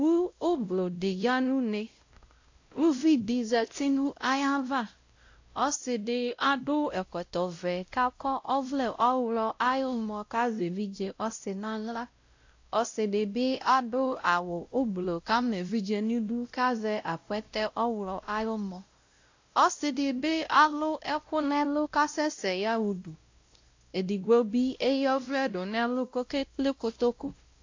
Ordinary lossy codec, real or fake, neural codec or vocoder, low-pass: AAC, 48 kbps; fake; codec, 16 kHz, 0.5 kbps, X-Codec, WavLM features, trained on Multilingual LibriSpeech; 7.2 kHz